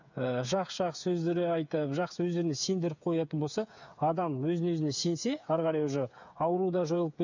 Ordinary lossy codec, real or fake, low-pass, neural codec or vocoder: none; fake; 7.2 kHz; codec, 16 kHz, 8 kbps, FreqCodec, smaller model